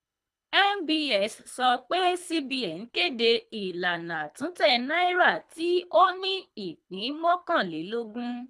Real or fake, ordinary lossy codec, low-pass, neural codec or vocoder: fake; none; none; codec, 24 kHz, 3 kbps, HILCodec